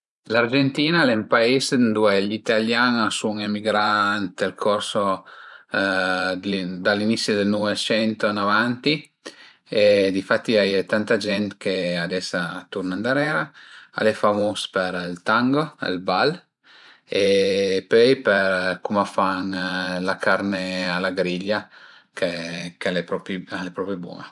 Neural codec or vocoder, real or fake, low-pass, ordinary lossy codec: vocoder, 44.1 kHz, 128 mel bands every 512 samples, BigVGAN v2; fake; 10.8 kHz; none